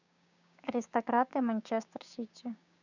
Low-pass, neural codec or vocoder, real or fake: 7.2 kHz; codec, 16 kHz, 6 kbps, DAC; fake